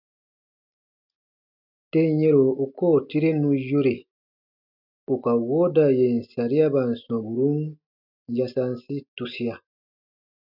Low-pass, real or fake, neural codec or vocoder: 5.4 kHz; real; none